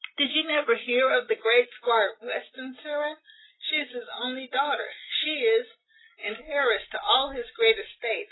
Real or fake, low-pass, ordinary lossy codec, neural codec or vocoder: real; 7.2 kHz; AAC, 16 kbps; none